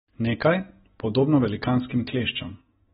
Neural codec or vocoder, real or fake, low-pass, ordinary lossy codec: none; real; 10.8 kHz; AAC, 16 kbps